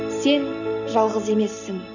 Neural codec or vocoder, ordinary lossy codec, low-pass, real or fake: none; none; 7.2 kHz; real